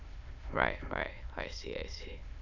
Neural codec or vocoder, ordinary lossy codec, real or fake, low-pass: autoencoder, 22.05 kHz, a latent of 192 numbers a frame, VITS, trained on many speakers; none; fake; 7.2 kHz